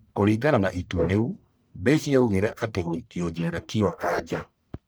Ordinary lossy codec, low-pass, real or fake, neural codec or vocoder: none; none; fake; codec, 44.1 kHz, 1.7 kbps, Pupu-Codec